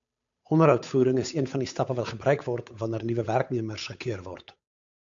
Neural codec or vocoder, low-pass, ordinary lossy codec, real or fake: codec, 16 kHz, 8 kbps, FunCodec, trained on Chinese and English, 25 frames a second; 7.2 kHz; AAC, 64 kbps; fake